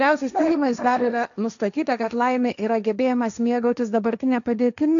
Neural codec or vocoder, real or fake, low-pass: codec, 16 kHz, 1.1 kbps, Voila-Tokenizer; fake; 7.2 kHz